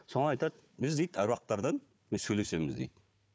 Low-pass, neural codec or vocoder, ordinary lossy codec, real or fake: none; codec, 16 kHz, 4 kbps, FunCodec, trained on Chinese and English, 50 frames a second; none; fake